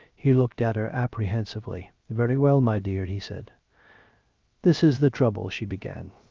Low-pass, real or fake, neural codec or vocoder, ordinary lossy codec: 7.2 kHz; fake; codec, 16 kHz, 0.3 kbps, FocalCodec; Opus, 24 kbps